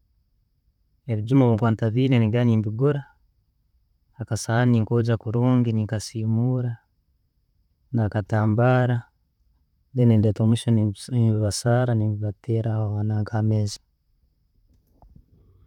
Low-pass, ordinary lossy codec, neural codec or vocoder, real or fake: 19.8 kHz; none; vocoder, 44.1 kHz, 128 mel bands, Pupu-Vocoder; fake